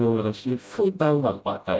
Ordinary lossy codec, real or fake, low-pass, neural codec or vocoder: none; fake; none; codec, 16 kHz, 0.5 kbps, FreqCodec, smaller model